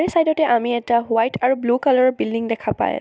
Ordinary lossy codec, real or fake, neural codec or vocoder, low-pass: none; real; none; none